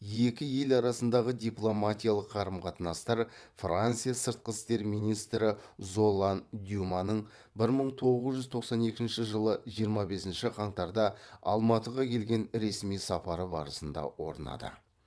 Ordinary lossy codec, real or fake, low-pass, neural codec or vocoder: none; fake; none; vocoder, 22.05 kHz, 80 mel bands, WaveNeXt